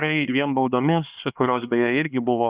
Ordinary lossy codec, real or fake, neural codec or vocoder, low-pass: Opus, 64 kbps; fake; codec, 16 kHz, 2 kbps, X-Codec, HuBERT features, trained on LibriSpeech; 3.6 kHz